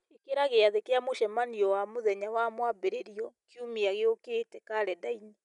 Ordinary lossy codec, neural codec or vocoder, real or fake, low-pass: none; none; real; 10.8 kHz